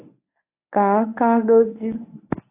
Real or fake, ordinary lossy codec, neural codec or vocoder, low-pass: fake; AAC, 24 kbps; codec, 24 kHz, 0.9 kbps, WavTokenizer, medium speech release version 1; 3.6 kHz